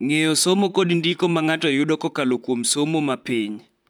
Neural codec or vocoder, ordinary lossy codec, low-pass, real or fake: vocoder, 44.1 kHz, 128 mel bands, Pupu-Vocoder; none; none; fake